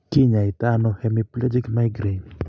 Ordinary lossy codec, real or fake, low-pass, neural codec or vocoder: none; real; none; none